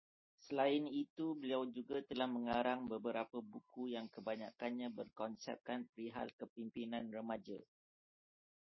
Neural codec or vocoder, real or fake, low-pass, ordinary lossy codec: none; real; 7.2 kHz; MP3, 24 kbps